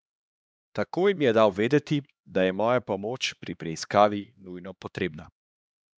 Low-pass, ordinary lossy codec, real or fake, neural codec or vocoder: none; none; fake; codec, 16 kHz, 2 kbps, X-Codec, HuBERT features, trained on LibriSpeech